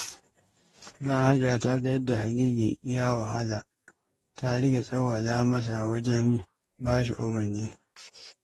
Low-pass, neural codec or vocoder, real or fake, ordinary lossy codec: 19.8 kHz; codec, 44.1 kHz, 2.6 kbps, DAC; fake; AAC, 32 kbps